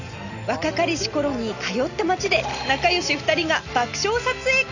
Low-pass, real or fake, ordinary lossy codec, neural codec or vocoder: 7.2 kHz; real; none; none